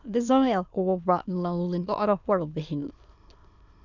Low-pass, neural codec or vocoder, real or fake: 7.2 kHz; autoencoder, 22.05 kHz, a latent of 192 numbers a frame, VITS, trained on many speakers; fake